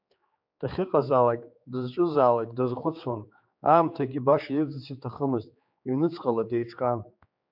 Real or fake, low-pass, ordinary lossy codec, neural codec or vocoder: fake; 5.4 kHz; MP3, 48 kbps; codec, 16 kHz, 4 kbps, X-Codec, HuBERT features, trained on general audio